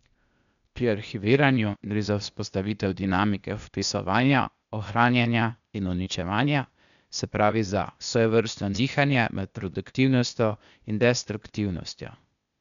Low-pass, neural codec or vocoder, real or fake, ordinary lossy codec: 7.2 kHz; codec, 16 kHz, 0.8 kbps, ZipCodec; fake; none